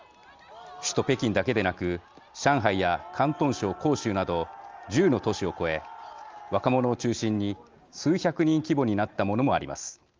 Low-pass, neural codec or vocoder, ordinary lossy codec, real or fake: 7.2 kHz; none; Opus, 32 kbps; real